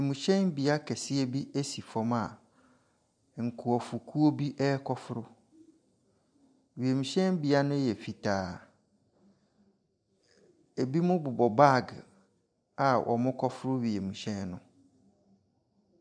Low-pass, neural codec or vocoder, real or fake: 9.9 kHz; none; real